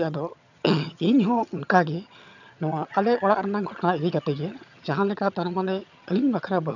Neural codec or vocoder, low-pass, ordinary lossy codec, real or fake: vocoder, 22.05 kHz, 80 mel bands, HiFi-GAN; 7.2 kHz; none; fake